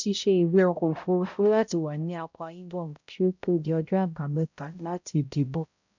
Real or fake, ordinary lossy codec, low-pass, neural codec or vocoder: fake; none; 7.2 kHz; codec, 16 kHz, 0.5 kbps, X-Codec, HuBERT features, trained on balanced general audio